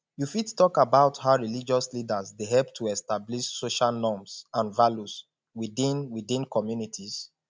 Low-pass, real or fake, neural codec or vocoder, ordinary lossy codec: none; real; none; none